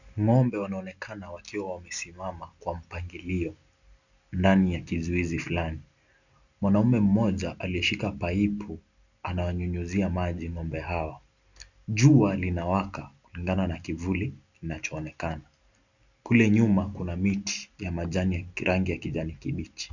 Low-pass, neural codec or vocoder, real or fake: 7.2 kHz; none; real